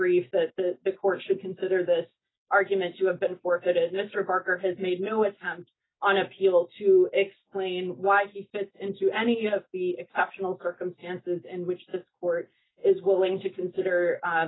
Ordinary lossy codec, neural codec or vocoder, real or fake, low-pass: AAC, 16 kbps; none; real; 7.2 kHz